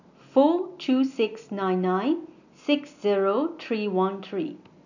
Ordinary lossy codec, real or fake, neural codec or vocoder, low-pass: none; real; none; 7.2 kHz